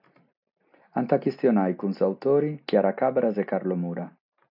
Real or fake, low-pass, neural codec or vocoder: real; 5.4 kHz; none